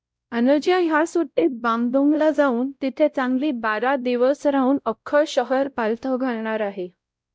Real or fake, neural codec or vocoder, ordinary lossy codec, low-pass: fake; codec, 16 kHz, 0.5 kbps, X-Codec, WavLM features, trained on Multilingual LibriSpeech; none; none